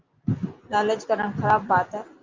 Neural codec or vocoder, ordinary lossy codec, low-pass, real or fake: none; Opus, 32 kbps; 7.2 kHz; real